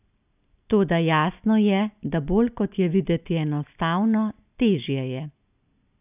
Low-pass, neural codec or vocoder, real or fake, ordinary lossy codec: 3.6 kHz; none; real; none